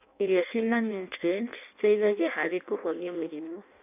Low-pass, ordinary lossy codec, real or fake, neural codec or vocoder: 3.6 kHz; none; fake; codec, 16 kHz in and 24 kHz out, 0.6 kbps, FireRedTTS-2 codec